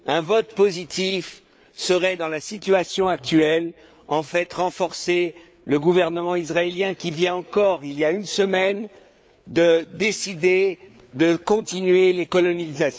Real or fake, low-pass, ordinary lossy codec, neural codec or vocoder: fake; none; none; codec, 16 kHz, 4 kbps, FreqCodec, larger model